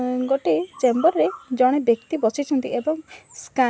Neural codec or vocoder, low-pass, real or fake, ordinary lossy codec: none; none; real; none